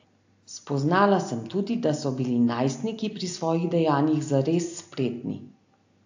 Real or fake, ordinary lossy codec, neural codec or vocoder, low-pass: real; AAC, 48 kbps; none; 7.2 kHz